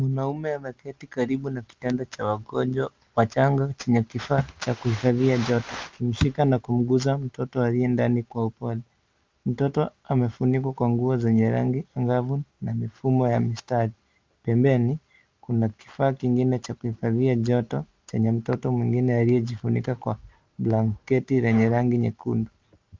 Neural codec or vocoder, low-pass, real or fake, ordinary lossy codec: none; 7.2 kHz; real; Opus, 24 kbps